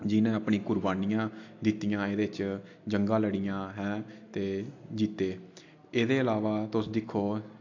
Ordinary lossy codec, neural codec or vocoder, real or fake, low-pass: AAC, 48 kbps; none; real; 7.2 kHz